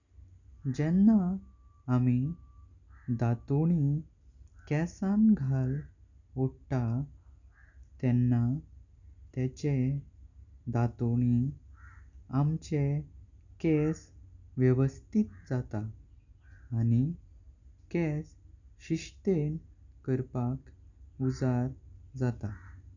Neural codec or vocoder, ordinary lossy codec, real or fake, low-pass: none; none; real; 7.2 kHz